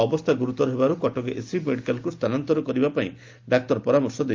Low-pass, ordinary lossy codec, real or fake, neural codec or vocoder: 7.2 kHz; Opus, 24 kbps; real; none